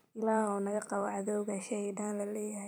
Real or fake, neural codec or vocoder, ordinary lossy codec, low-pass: fake; vocoder, 44.1 kHz, 128 mel bands every 256 samples, BigVGAN v2; none; none